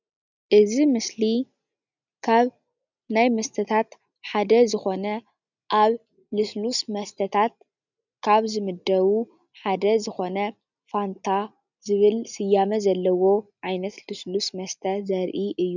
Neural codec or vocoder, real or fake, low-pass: none; real; 7.2 kHz